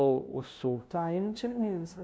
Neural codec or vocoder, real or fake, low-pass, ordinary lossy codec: codec, 16 kHz, 0.5 kbps, FunCodec, trained on LibriTTS, 25 frames a second; fake; none; none